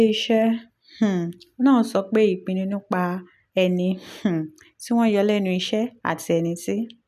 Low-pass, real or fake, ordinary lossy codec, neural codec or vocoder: 14.4 kHz; real; none; none